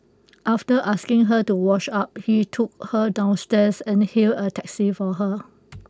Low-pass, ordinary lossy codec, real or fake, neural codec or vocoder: none; none; real; none